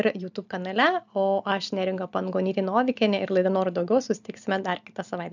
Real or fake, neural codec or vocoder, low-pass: real; none; 7.2 kHz